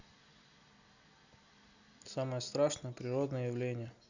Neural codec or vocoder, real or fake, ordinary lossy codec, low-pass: none; real; none; 7.2 kHz